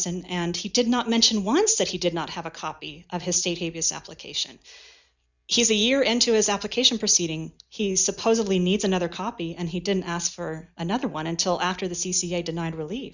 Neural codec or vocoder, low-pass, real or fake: none; 7.2 kHz; real